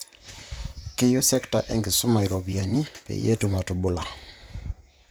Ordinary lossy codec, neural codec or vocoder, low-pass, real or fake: none; vocoder, 44.1 kHz, 128 mel bands, Pupu-Vocoder; none; fake